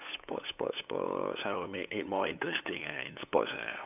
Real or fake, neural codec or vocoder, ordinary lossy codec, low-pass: fake; codec, 16 kHz, 8 kbps, FunCodec, trained on LibriTTS, 25 frames a second; none; 3.6 kHz